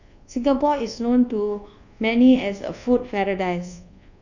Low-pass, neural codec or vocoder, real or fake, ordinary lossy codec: 7.2 kHz; codec, 24 kHz, 1.2 kbps, DualCodec; fake; none